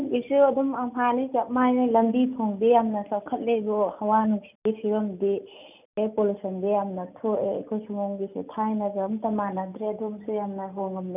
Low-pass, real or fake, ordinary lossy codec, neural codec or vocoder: 3.6 kHz; real; none; none